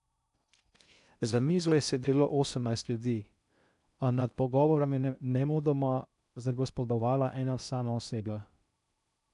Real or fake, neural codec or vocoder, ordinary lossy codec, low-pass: fake; codec, 16 kHz in and 24 kHz out, 0.6 kbps, FocalCodec, streaming, 4096 codes; none; 10.8 kHz